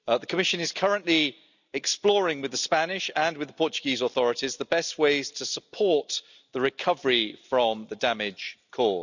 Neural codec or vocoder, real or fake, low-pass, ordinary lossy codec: none; real; 7.2 kHz; none